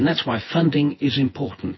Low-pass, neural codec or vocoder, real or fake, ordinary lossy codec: 7.2 kHz; vocoder, 24 kHz, 100 mel bands, Vocos; fake; MP3, 24 kbps